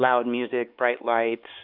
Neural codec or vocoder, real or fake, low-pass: codec, 16 kHz, 4 kbps, X-Codec, HuBERT features, trained on LibriSpeech; fake; 5.4 kHz